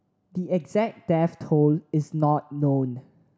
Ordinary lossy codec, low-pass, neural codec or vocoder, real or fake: none; none; none; real